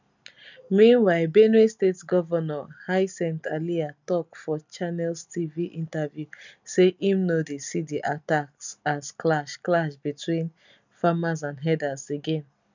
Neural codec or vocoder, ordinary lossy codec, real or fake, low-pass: none; none; real; 7.2 kHz